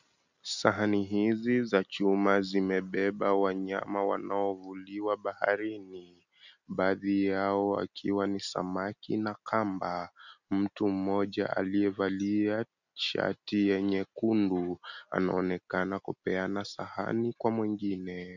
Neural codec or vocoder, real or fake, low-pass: none; real; 7.2 kHz